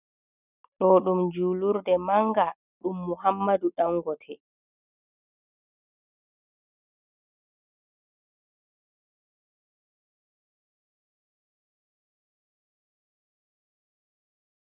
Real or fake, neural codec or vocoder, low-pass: real; none; 3.6 kHz